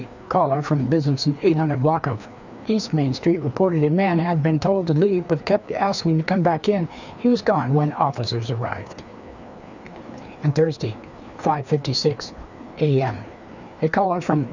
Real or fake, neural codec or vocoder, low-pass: fake; codec, 16 kHz, 2 kbps, FreqCodec, larger model; 7.2 kHz